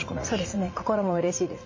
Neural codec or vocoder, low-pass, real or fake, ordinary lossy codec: vocoder, 44.1 kHz, 80 mel bands, Vocos; 7.2 kHz; fake; MP3, 32 kbps